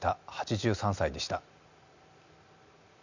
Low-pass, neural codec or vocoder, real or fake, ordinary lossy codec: 7.2 kHz; none; real; none